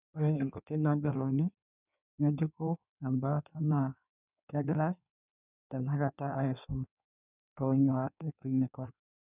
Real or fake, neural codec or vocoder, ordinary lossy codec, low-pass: fake; codec, 16 kHz in and 24 kHz out, 1.1 kbps, FireRedTTS-2 codec; none; 3.6 kHz